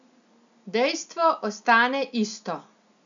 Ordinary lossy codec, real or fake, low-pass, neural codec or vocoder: none; real; 7.2 kHz; none